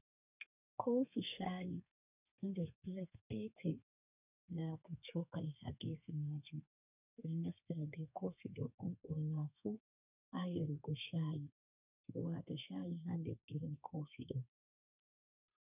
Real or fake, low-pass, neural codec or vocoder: fake; 3.6 kHz; codec, 32 kHz, 1.9 kbps, SNAC